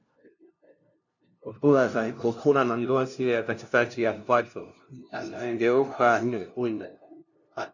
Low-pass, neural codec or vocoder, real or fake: 7.2 kHz; codec, 16 kHz, 0.5 kbps, FunCodec, trained on LibriTTS, 25 frames a second; fake